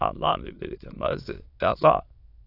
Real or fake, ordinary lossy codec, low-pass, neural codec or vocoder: fake; AAC, 32 kbps; 5.4 kHz; autoencoder, 22.05 kHz, a latent of 192 numbers a frame, VITS, trained on many speakers